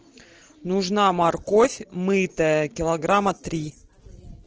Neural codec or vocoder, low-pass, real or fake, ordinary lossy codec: none; 7.2 kHz; real; Opus, 16 kbps